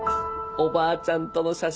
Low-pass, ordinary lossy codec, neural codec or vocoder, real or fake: none; none; none; real